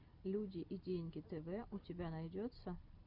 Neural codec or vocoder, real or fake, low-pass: none; real; 5.4 kHz